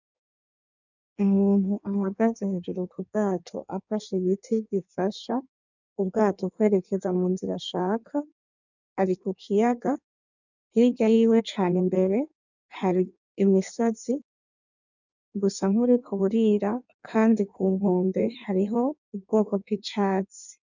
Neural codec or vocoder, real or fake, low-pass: codec, 16 kHz in and 24 kHz out, 1.1 kbps, FireRedTTS-2 codec; fake; 7.2 kHz